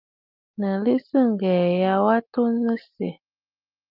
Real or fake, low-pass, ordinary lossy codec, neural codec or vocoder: real; 5.4 kHz; Opus, 24 kbps; none